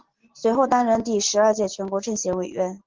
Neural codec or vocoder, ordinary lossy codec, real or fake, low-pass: none; Opus, 16 kbps; real; 7.2 kHz